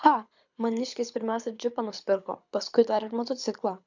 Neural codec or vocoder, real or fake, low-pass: codec, 24 kHz, 6 kbps, HILCodec; fake; 7.2 kHz